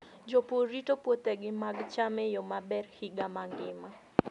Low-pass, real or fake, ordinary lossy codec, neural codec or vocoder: 10.8 kHz; real; none; none